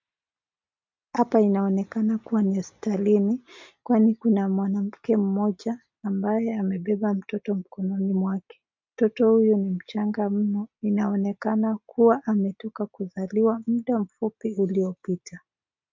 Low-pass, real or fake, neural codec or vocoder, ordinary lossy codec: 7.2 kHz; real; none; MP3, 48 kbps